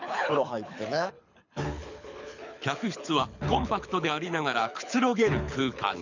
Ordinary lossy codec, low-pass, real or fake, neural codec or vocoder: none; 7.2 kHz; fake; codec, 24 kHz, 6 kbps, HILCodec